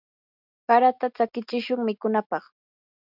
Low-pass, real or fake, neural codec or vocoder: 5.4 kHz; real; none